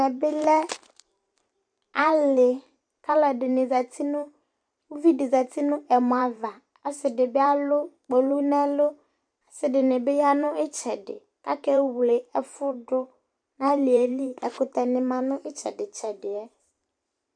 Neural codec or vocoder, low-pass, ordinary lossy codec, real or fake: vocoder, 44.1 kHz, 128 mel bands every 512 samples, BigVGAN v2; 9.9 kHz; MP3, 96 kbps; fake